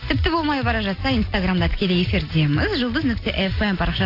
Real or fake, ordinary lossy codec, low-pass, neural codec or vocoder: real; AAC, 32 kbps; 5.4 kHz; none